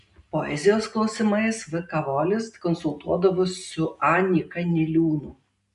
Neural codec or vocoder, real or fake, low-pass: none; real; 10.8 kHz